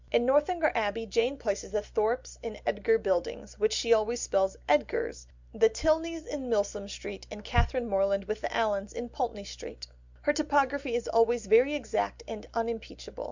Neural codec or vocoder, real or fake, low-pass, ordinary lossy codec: none; real; 7.2 kHz; Opus, 64 kbps